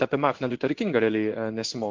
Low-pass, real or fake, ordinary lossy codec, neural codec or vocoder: 7.2 kHz; fake; Opus, 24 kbps; codec, 16 kHz in and 24 kHz out, 1 kbps, XY-Tokenizer